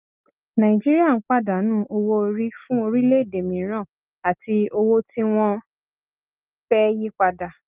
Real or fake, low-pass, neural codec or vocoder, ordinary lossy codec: real; 3.6 kHz; none; Opus, 32 kbps